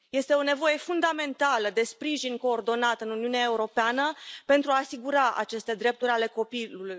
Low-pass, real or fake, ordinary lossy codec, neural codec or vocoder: none; real; none; none